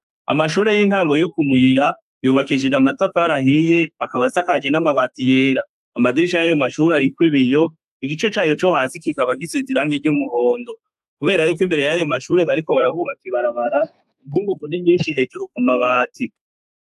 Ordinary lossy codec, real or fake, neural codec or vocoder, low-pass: AAC, 96 kbps; fake; codec, 32 kHz, 1.9 kbps, SNAC; 14.4 kHz